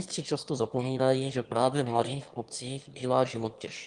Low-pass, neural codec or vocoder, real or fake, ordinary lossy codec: 9.9 kHz; autoencoder, 22.05 kHz, a latent of 192 numbers a frame, VITS, trained on one speaker; fake; Opus, 24 kbps